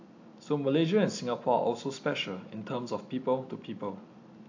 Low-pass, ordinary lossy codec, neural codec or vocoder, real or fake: 7.2 kHz; AAC, 48 kbps; none; real